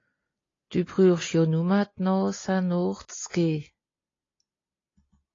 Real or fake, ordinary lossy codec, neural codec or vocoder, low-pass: real; AAC, 32 kbps; none; 7.2 kHz